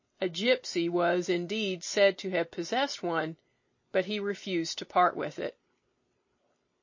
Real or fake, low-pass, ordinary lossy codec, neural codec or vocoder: real; 7.2 kHz; MP3, 32 kbps; none